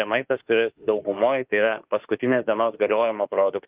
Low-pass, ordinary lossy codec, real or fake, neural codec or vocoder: 3.6 kHz; Opus, 24 kbps; fake; autoencoder, 48 kHz, 32 numbers a frame, DAC-VAE, trained on Japanese speech